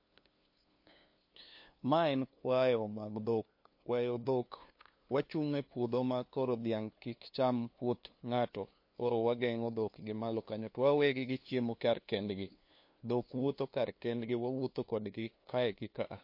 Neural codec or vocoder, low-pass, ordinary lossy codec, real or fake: codec, 16 kHz, 2 kbps, FunCodec, trained on LibriTTS, 25 frames a second; 5.4 kHz; MP3, 32 kbps; fake